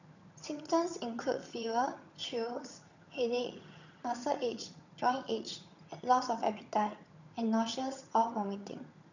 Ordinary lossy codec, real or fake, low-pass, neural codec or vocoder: none; fake; 7.2 kHz; vocoder, 22.05 kHz, 80 mel bands, HiFi-GAN